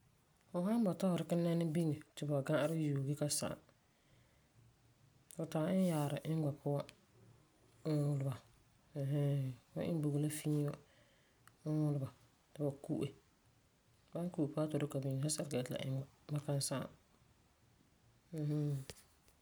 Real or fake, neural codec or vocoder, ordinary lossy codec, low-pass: real; none; none; none